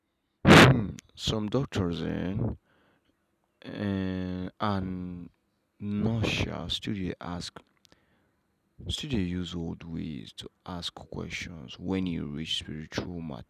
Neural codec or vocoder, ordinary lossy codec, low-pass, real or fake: none; none; 14.4 kHz; real